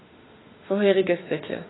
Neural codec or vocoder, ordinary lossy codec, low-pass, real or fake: autoencoder, 48 kHz, 32 numbers a frame, DAC-VAE, trained on Japanese speech; AAC, 16 kbps; 7.2 kHz; fake